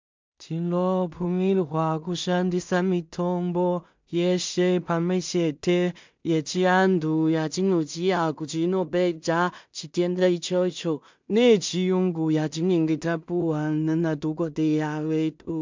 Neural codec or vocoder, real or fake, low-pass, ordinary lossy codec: codec, 16 kHz in and 24 kHz out, 0.4 kbps, LongCat-Audio-Codec, two codebook decoder; fake; 7.2 kHz; MP3, 64 kbps